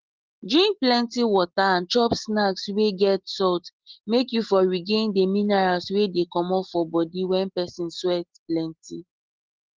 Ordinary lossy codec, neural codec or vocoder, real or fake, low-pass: Opus, 16 kbps; none; real; 7.2 kHz